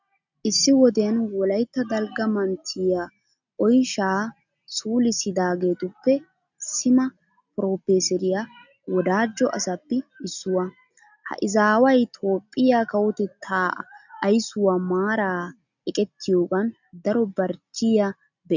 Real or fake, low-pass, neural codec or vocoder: real; 7.2 kHz; none